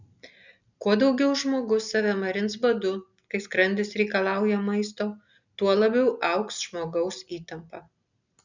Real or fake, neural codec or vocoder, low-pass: real; none; 7.2 kHz